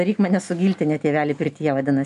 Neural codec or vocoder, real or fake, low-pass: none; real; 10.8 kHz